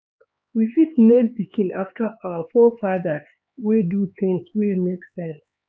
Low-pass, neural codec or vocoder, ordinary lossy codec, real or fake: none; codec, 16 kHz, 4 kbps, X-Codec, HuBERT features, trained on LibriSpeech; none; fake